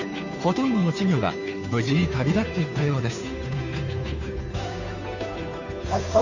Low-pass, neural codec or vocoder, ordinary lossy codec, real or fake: 7.2 kHz; codec, 16 kHz, 2 kbps, FunCodec, trained on Chinese and English, 25 frames a second; none; fake